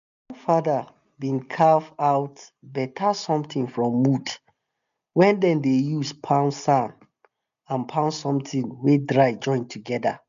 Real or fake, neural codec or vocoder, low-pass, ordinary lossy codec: real; none; 7.2 kHz; none